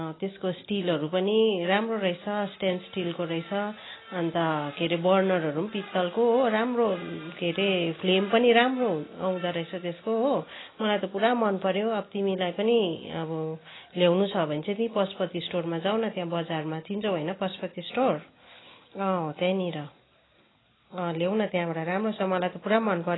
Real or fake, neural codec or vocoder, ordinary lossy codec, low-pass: real; none; AAC, 16 kbps; 7.2 kHz